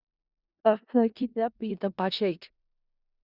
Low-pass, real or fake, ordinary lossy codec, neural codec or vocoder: 5.4 kHz; fake; Opus, 64 kbps; codec, 16 kHz in and 24 kHz out, 0.4 kbps, LongCat-Audio-Codec, four codebook decoder